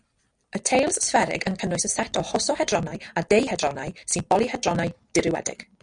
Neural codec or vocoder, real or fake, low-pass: none; real; 9.9 kHz